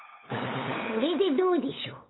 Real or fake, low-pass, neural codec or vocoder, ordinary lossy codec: fake; 7.2 kHz; codec, 16 kHz, 8 kbps, FunCodec, trained on LibriTTS, 25 frames a second; AAC, 16 kbps